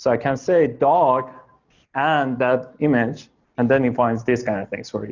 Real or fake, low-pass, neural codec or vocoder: real; 7.2 kHz; none